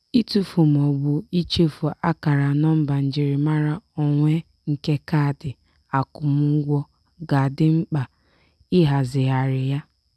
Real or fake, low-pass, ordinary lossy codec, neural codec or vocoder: real; none; none; none